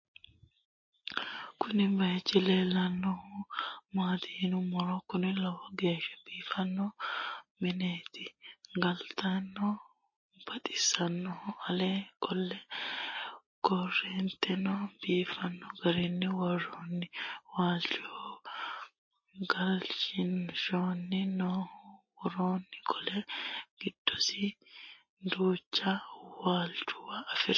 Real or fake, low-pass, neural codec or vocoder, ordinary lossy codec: real; 7.2 kHz; none; MP3, 32 kbps